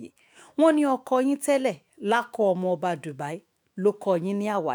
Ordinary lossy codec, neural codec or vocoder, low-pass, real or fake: none; autoencoder, 48 kHz, 128 numbers a frame, DAC-VAE, trained on Japanese speech; none; fake